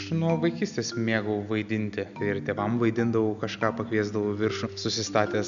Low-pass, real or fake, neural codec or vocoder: 7.2 kHz; real; none